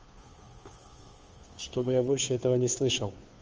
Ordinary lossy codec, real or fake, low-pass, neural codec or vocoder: Opus, 24 kbps; fake; 7.2 kHz; codec, 16 kHz, 2 kbps, FunCodec, trained on Chinese and English, 25 frames a second